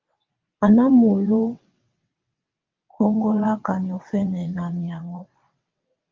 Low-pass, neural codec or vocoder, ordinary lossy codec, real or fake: 7.2 kHz; vocoder, 22.05 kHz, 80 mel bands, WaveNeXt; Opus, 24 kbps; fake